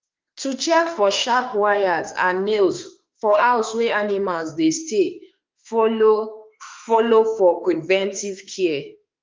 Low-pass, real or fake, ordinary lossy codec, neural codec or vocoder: 7.2 kHz; fake; Opus, 24 kbps; autoencoder, 48 kHz, 32 numbers a frame, DAC-VAE, trained on Japanese speech